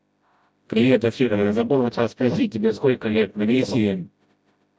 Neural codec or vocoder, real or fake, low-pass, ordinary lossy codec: codec, 16 kHz, 0.5 kbps, FreqCodec, smaller model; fake; none; none